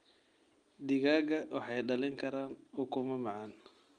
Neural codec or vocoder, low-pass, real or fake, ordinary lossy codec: none; 9.9 kHz; real; Opus, 32 kbps